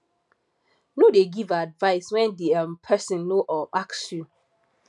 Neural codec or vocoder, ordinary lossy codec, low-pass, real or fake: none; none; 10.8 kHz; real